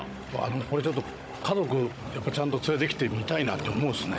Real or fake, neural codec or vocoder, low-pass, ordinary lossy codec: fake; codec, 16 kHz, 16 kbps, FunCodec, trained on Chinese and English, 50 frames a second; none; none